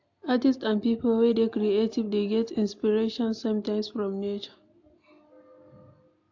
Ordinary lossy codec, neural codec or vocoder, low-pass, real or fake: AAC, 48 kbps; none; 7.2 kHz; real